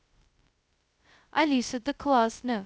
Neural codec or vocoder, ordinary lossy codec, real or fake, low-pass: codec, 16 kHz, 0.2 kbps, FocalCodec; none; fake; none